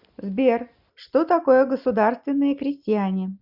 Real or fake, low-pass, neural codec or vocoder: real; 5.4 kHz; none